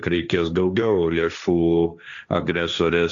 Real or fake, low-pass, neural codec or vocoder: fake; 7.2 kHz; codec, 16 kHz, 1.1 kbps, Voila-Tokenizer